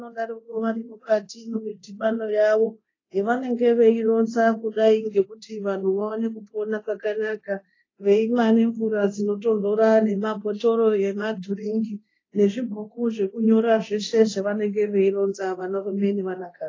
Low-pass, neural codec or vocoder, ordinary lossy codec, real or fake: 7.2 kHz; codec, 24 kHz, 0.9 kbps, DualCodec; AAC, 32 kbps; fake